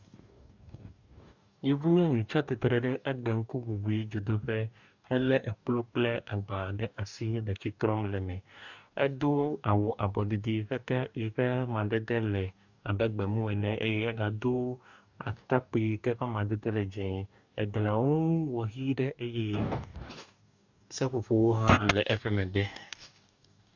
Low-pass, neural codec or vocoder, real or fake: 7.2 kHz; codec, 44.1 kHz, 2.6 kbps, DAC; fake